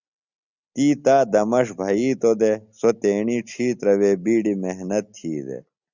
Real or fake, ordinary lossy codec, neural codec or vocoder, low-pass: real; Opus, 64 kbps; none; 7.2 kHz